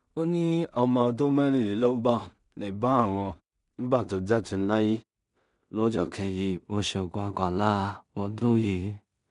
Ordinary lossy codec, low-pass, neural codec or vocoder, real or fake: none; 10.8 kHz; codec, 16 kHz in and 24 kHz out, 0.4 kbps, LongCat-Audio-Codec, two codebook decoder; fake